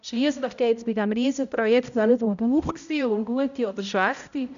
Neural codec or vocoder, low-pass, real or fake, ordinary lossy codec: codec, 16 kHz, 0.5 kbps, X-Codec, HuBERT features, trained on balanced general audio; 7.2 kHz; fake; MP3, 96 kbps